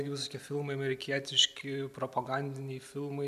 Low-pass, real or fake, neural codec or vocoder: 14.4 kHz; real; none